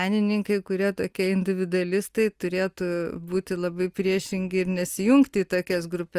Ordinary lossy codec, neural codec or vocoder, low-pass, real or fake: Opus, 32 kbps; none; 14.4 kHz; real